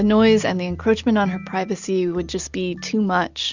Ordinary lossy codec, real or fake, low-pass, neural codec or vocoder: Opus, 64 kbps; real; 7.2 kHz; none